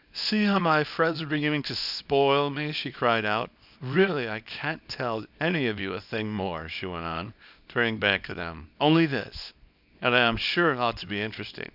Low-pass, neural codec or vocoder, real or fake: 5.4 kHz; codec, 24 kHz, 0.9 kbps, WavTokenizer, small release; fake